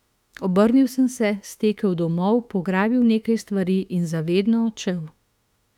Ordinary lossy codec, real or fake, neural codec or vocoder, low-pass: none; fake; autoencoder, 48 kHz, 32 numbers a frame, DAC-VAE, trained on Japanese speech; 19.8 kHz